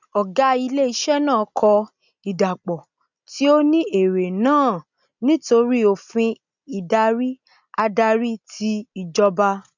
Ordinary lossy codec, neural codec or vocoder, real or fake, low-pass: none; none; real; 7.2 kHz